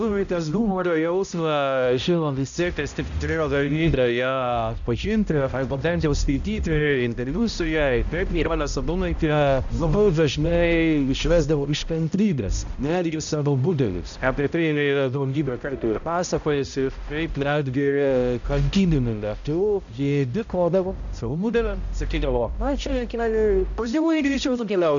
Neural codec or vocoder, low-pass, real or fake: codec, 16 kHz, 0.5 kbps, X-Codec, HuBERT features, trained on balanced general audio; 7.2 kHz; fake